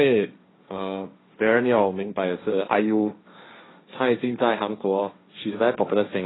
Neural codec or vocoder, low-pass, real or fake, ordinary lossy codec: codec, 16 kHz, 1.1 kbps, Voila-Tokenizer; 7.2 kHz; fake; AAC, 16 kbps